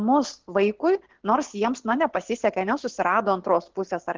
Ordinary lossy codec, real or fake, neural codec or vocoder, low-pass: Opus, 32 kbps; real; none; 7.2 kHz